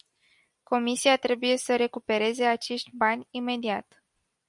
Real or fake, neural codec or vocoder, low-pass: real; none; 10.8 kHz